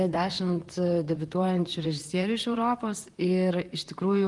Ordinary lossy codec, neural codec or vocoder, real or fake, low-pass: Opus, 24 kbps; vocoder, 44.1 kHz, 128 mel bands, Pupu-Vocoder; fake; 10.8 kHz